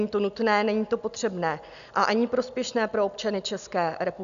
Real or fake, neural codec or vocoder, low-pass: real; none; 7.2 kHz